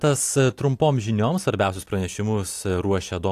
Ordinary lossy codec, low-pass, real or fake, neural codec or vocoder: AAC, 64 kbps; 14.4 kHz; real; none